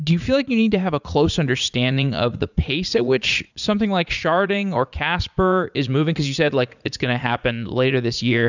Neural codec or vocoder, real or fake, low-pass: vocoder, 44.1 kHz, 80 mel bands, Vocos; fake; 7.2 kHz